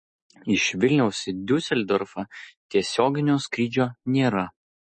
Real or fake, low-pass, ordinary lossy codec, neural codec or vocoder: real; 10.8 kHz; MP3, 32 kbps; none